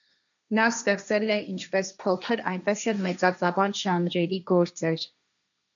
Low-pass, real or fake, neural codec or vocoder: 7.2 kHz; fake; codec, 16 kHz, 1.1 kbps, Voila-Tokenizer